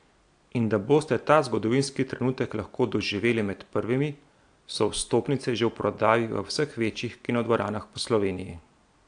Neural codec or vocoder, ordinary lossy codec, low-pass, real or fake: none; AAC, 64 kbps; 9.9 kHz; real